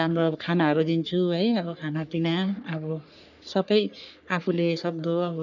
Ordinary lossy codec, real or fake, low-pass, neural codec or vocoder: none; fake; 7.2 kHz; codec, 44.1 kHz, 3.4 kbps, Pupu-Codec